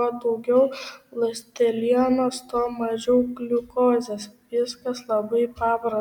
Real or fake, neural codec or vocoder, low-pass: real; none; 19.8 kHz